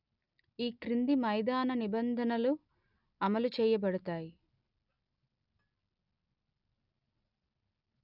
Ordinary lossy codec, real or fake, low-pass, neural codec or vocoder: none; real; 5.4 kHz; none